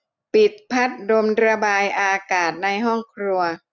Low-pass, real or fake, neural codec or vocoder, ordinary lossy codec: 7.2 kHz; real; none; none